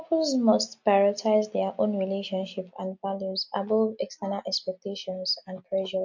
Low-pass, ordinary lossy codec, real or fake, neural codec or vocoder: 7.2 kHz; MP3, 48 kbps; real; none